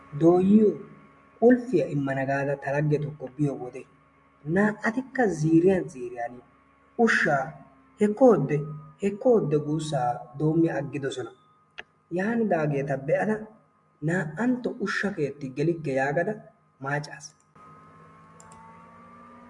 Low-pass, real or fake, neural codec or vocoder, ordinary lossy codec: 10.8 kHz; real; none; MP3, 64 kbps